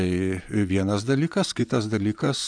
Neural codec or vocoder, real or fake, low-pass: vocoder, 24 kHz, 100 mel bands, Vocos; fake; 9.9 kHz